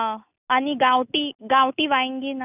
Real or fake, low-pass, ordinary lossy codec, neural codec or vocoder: real; 3.6 kHz; none; none